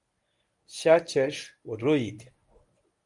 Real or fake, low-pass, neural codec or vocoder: fake; 10.8 kHz; codec, 24 kHz, 0.9 kbps, WavTokenizer, medium speech release version 1